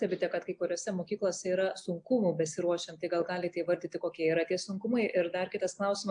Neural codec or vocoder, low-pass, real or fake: none; 9.9 kHz; real